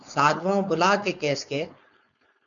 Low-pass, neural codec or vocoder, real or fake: 7.2 kHz; codec, 16 kHz, 4.8 kbps, FACodec; fake